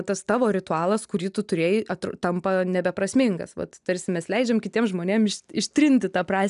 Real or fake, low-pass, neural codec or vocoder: real; 10.8 kHz; none